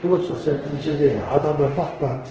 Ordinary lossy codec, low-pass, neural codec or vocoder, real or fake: Opus, 16 kbps; 7.2 kHz; codec, 24 kHz, 0.5 kbps, DualCodec; fake